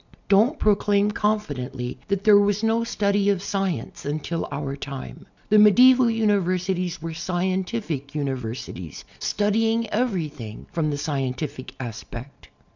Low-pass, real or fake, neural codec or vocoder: 7.2 kHz; real; none